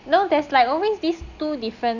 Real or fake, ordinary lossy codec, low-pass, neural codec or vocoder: real; none; 7.2 kHz; none